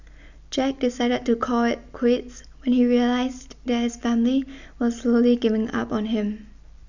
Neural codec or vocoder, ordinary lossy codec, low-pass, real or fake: none; none; 7.2 kHz; real